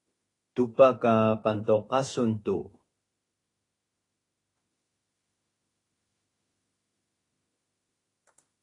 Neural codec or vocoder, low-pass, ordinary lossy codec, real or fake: autoencoder, 48 kHz, 32 numbers a frame, DAC-VAE, trained on Japanese speech; 10.8 kHz; AAC, 32 kbps; fake